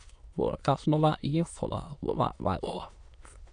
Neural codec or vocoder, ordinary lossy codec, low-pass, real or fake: autoencoder, 22.05 kHz, a latent of 192 numbers a frame, VITS, trained on many speakers; AAC, 64 kbps; 9.9 kHz; fake